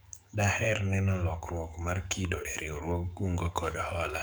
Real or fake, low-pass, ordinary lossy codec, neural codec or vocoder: fake; none; none; codec, 44.1 kHz, 7.8 kbps, DAC